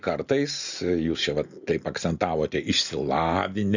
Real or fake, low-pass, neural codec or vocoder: real; 7.2 kHz; none